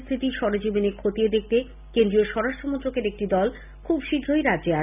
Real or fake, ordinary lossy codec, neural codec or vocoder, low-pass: real; none; none; 3.6 kHz